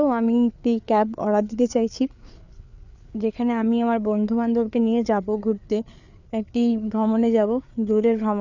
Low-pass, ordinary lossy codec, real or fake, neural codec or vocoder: 7.2 kHz; none; fake; codec, 16 kHz, 4 kbps, FreqCodec, larger model